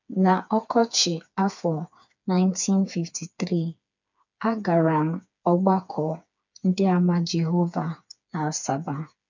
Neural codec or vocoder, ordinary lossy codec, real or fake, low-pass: codec, 16 kHz, 4 kbps, FreqCodec, smaller model; none; fake; 7.2 kHz